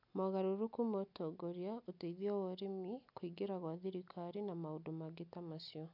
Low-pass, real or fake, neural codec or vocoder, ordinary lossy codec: 5.4 kHz; real; none; MP3, 48 kbps